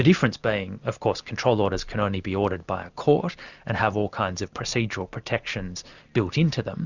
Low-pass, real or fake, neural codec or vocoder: 7.2 kHz; real; none